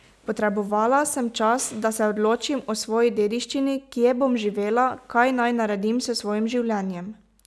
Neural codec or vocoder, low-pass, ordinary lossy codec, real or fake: none; none; none; real